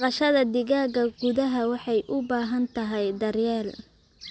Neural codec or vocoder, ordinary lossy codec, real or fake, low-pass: none; none; real; none